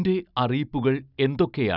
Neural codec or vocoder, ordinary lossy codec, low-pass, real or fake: none; none; 5.4 kHz; real